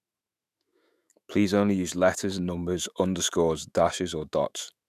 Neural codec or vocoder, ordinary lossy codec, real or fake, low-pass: autoencoder, 48 kHz, 128 numbers a frame, DAC-VAE, trained on Japanese speech; none; fake; 14.4 kHz